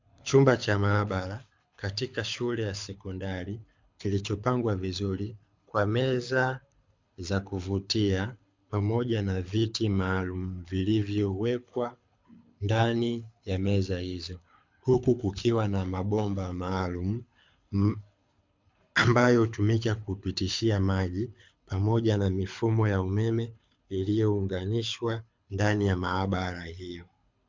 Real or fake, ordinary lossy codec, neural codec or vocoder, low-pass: fake; MP3, 64 kbps; codec, 24 kHz, 6 kbps, HILCodec; 7.2 kHz